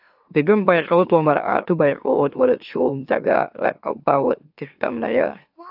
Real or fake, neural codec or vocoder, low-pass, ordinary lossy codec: fake; autoencoder, 44.1 kHz, a latent of 192 numbers a frame, MeloTTS; 5.4 kHz; AAC, 48 kbps